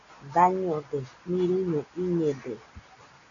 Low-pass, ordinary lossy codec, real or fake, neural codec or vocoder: 7.2 kHz; MP3, 96 kbps; real; none